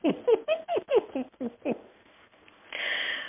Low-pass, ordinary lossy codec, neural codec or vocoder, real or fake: 3.6 kHz; MP3, 32 kbps; codec, 16 kHz in and 24 kHz out, 1 kbps, XY-Tokenizer; fake